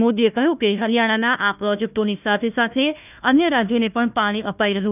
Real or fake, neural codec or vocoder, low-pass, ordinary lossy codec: fake; codec, 16 kHz, 1 kbps, FunCodec, trained on Chinese and English, 50 frames a second; 3.6 kHz; none